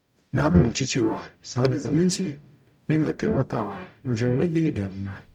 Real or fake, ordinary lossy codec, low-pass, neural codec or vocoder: fake; none; 19.8 kHz; codec, 44.1 kHz, 0.9 kbps, DAC